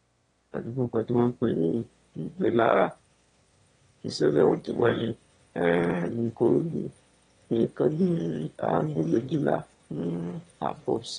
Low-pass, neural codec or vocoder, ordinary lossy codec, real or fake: 9.9 kHz; autoencoder, 22.05 kHz, a latent of 192 numbers a frame, VITS, trained on one speaker; AAC, 32 kbps; fake